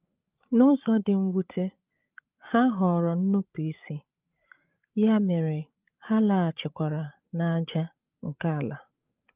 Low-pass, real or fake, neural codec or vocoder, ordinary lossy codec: 3.6 kHz; fake; codec, 16 kHz, 8 kbps, FreqCodec, larger model; Opus, 24 kbps